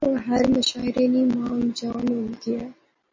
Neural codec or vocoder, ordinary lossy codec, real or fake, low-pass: none; MP3, 32 kbps; real; 7.2 kHz